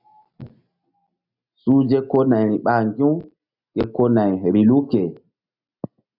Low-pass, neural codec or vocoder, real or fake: 5.4 kHz; none; real